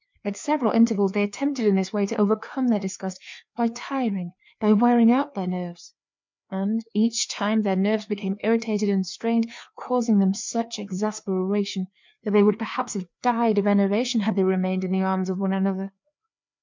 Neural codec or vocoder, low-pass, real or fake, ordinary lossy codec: codec, 16 kHz, 2 kbps, FreqCodec, larger model; 7.2 kHz; fake; MP3, 64 kbps